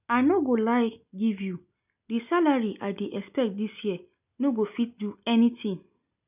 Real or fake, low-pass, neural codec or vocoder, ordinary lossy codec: real; 3.6 kHz; none; none